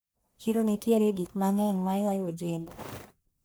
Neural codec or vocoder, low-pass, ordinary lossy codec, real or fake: codec, 44.1 kHz, 1.7 kbps, Pupu-Codec; none; none; fake